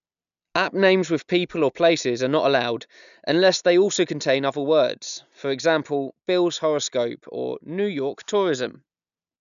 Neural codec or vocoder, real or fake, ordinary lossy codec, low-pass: none; real; none; 7.2 kHz